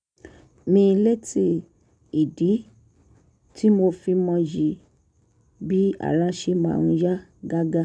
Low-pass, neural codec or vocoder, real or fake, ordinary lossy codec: 9.9 kHz; none; real; none